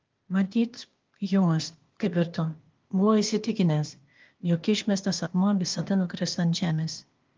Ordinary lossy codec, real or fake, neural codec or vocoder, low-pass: Opus, 32 kbps; fake; codec, 16 kHz, 0.8 kbps, ZipCodec; 7.2 kHz